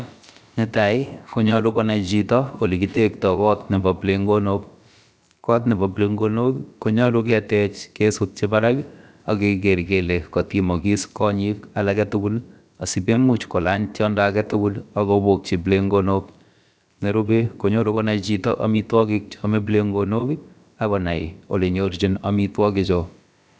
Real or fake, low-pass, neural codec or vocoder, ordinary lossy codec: fake; none; codec, 16 kHz, about 1 kbps, DyCAST, with the encoder's durations; none